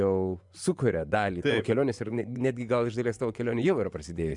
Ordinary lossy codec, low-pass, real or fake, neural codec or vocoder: MP3, 96 kbps; 10.8 kHz; fake; vocoder, 24 kHz, 100 mel bands, Vocos